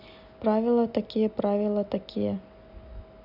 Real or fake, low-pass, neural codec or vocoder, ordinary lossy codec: real; 5.4 kHz; none; none